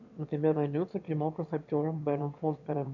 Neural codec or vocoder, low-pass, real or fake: autoencoder, 22.05 kHz, a latent of 192 numbers a frame, VITS, trained on one speaker; 7.2 kHz; fake